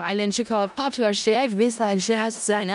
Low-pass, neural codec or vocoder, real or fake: 10.8 kHz; codec, 16 kHz in and 24 kHz out, 0.4 kbps, LongCat-Audio-Codec, four codebook decoder; fake